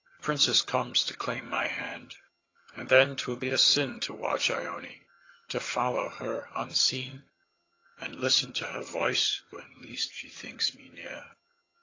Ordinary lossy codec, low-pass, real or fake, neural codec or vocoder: AAC, 32 kbps; 7.2 kHz; fake; vocoder, 22.05 kHz, 80 mel bands, HiFi-GAN